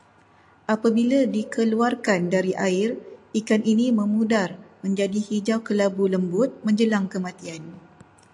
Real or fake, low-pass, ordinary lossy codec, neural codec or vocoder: real; 10.8 kHz; AAC, 64 kbps; none